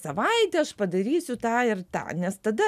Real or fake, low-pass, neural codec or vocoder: real; 14.4 kHz; none